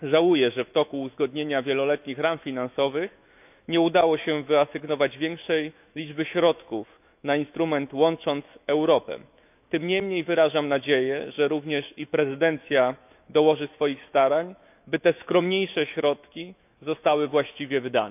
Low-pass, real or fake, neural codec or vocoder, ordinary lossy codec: 3.6 kHz; fake; autoencoder, 48 kHz, 128 numbers a frame, DAC-VAE, trained on Japanese speech; none